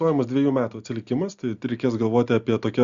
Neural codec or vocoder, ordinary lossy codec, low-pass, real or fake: none; Opus, 64 kbps; 7.2 kHz; real